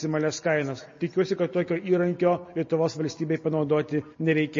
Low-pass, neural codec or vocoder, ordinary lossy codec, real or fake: 7.2 kHz; none; MP3, 32 kbps; real